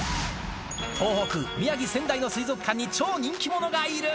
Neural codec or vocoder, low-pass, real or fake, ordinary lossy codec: none; none; real; none